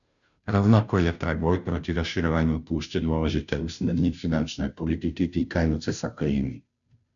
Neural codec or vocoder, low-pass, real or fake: codec, 16 kHz, 0.5 kbps, FunCodec, trained on Chinese and English, 25 frames a second; 7.2 kHz; fake